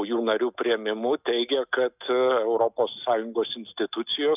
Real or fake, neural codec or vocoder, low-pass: real; none; 3.6 kHz